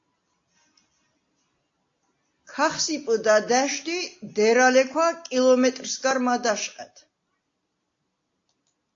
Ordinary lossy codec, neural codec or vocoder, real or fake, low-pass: AAC, 48 kbps; none; real; 7.2 kHz